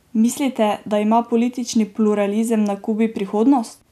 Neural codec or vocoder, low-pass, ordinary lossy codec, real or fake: none; 14.4 kHz; none; real